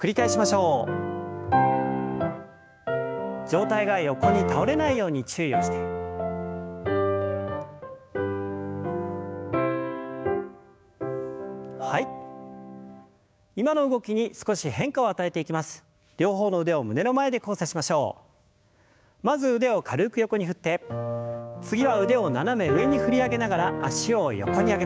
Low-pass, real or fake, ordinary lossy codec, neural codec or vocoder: none; fake; none; codec, 16 kHz, 6 kbps, DAC